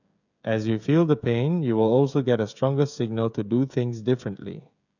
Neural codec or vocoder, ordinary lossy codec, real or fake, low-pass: codec, 16 kHz, 16 kbps, FreqCodec, smaller model; none; fake; 7.2 kHz